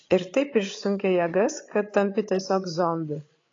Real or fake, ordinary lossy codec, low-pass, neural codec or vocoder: fake; AAC, 32 kbps; 7.2 kHz; codec, 16 kHz, 8 kbps, FreqCodec, larger model